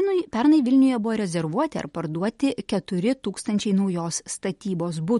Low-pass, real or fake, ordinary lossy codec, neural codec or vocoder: 19.8 kHz; real; MP3, 48 kbps; none